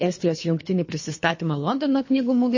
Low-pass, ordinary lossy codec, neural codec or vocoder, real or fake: 7.2 kHz; MP3, 32 kbps; codec, 24 kHz, 3 kbps, HILCodec; fake